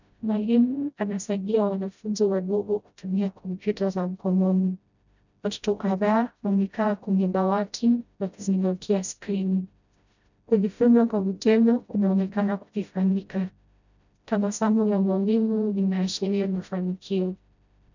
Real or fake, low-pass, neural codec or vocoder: fake; 7.2 kHz; codec, 16 kHz, 0.5 kbps, FreqCodec, smaller model